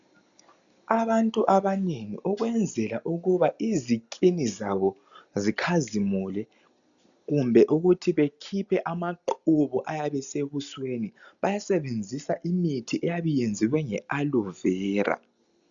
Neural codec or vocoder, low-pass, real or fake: none; 7.2 kHz; real